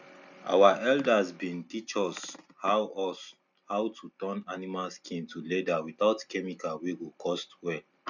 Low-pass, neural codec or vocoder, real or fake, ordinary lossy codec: 7.2 kHz; none; real; none